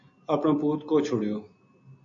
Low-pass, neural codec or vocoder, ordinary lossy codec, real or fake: 7.2 kHz; none; AAC, 48 kbps; real